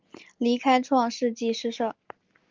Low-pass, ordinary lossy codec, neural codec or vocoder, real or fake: 7.2 kHz; Opus, 32 kbps; none; real